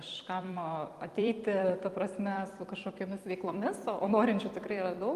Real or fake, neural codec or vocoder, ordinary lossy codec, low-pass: fake; vocoder, 44.1 kHz, 128 mel bands every 256 samples, BigVGAN v2; Opus, 32 kbps; 14.4 kHz